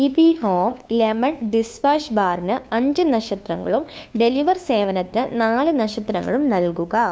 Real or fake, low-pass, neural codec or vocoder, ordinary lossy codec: fake; none; codec, 16 kHz, 2 kbps, FunCodec, trained on LibriTTS, 25 frames a second; none